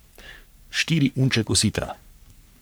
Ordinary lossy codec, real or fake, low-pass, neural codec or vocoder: none; fake; none; codec, 44.1 kHz, 3.4 kbps, Pupu-Codec